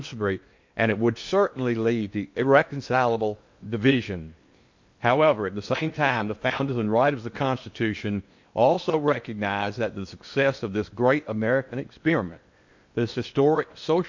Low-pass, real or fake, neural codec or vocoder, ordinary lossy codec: 7.2 kHz; fake; codec, 16 kHz in and 24 kHz out, 0.8 kbps, FocalCodec, streaming, 65536 codes; MP3, 48 kbps